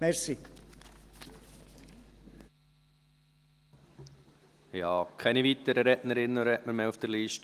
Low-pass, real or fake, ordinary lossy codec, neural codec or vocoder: 14.4 kHz; real; Opus, 24 kbps; none